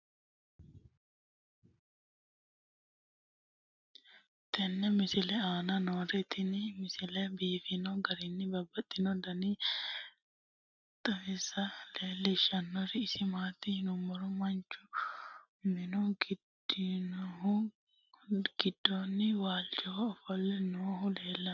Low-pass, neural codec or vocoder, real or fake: 7.2 kHz; none; real